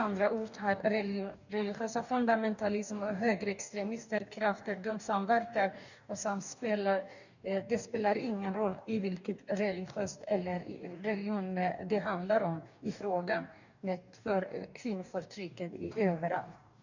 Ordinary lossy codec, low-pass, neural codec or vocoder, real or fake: none; 7.2 kHz; codec, 44.1 kHz, 2.6 kbps, DAC; fake